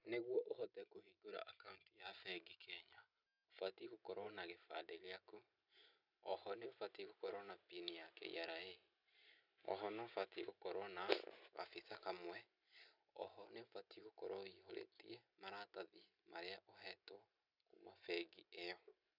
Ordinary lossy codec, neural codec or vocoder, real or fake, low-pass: none; none; real; 5.4 kHz